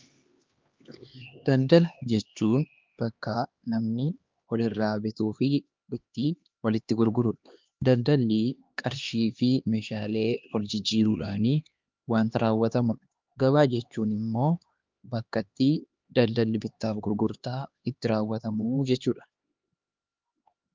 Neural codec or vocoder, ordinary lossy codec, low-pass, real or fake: codec, 16 kHz, 2 kbps, X-Codec, HuBERT features, trained on LibriSpeech; Opus, 32 kbps; 7.2 kHz; fake